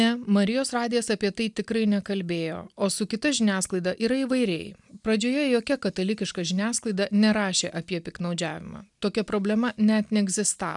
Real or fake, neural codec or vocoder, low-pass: real; none; 10.8 kHz